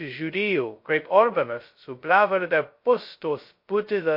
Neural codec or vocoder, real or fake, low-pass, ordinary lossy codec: codec, 16 kHz, 0.2 kbps, FocalCodec; fake; 5.4 kHz; MP3, 32 kbps